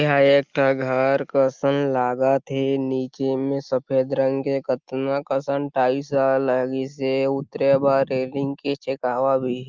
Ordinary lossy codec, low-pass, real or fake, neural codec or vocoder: Opus, 32 kbps; 7.2 kHz; real; none